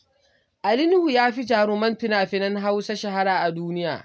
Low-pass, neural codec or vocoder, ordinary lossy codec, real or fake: none; none; none; real